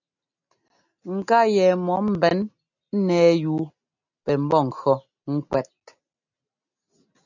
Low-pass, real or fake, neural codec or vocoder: 7.2 kHz; real; none